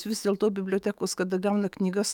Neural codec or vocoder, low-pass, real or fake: autoencoder, 48 kHz, 128 numbers a frame, DAC-VAE, trained on Japanese speech; 19.8 kHz; fake